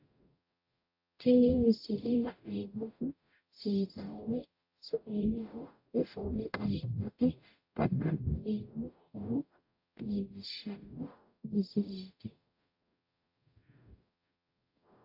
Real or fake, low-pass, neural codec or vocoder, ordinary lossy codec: fake; 5.4 kHz; codec, 44.1 kHz, 0.9 kbps, DAC; AAC, 48 kbps